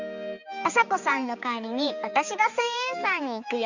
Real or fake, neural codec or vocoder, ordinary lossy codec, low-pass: fake; codec, 16 kHz, 4 kbps, X-Codec, HuBERT features, trained on balanced general audio; Opus, 64 kbps; 7.2 kHz